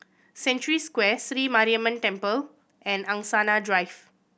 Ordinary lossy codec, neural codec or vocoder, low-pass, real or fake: none; none; none; real